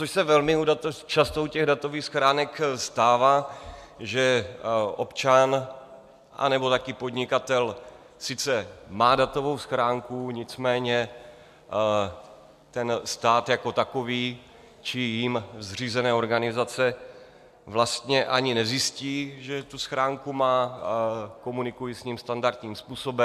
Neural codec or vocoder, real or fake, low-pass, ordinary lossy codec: none; real; 14.4 kHz; MP3, 96 kbps